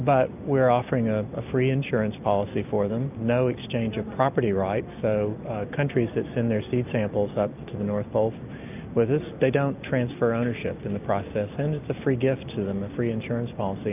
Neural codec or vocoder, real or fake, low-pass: none; real; 3.6 kHz